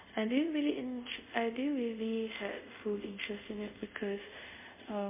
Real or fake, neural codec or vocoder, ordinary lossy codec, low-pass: fake; codec, 24 kHz, 0.5 kbps, DualCodec; MP3, 32 kbps; 3.6 kHz